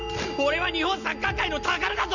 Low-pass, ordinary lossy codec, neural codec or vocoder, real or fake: 7.2 kHz; none; none; real